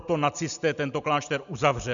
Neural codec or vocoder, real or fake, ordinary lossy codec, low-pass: none; real; MP3, 96 kbps; 7.2 kHz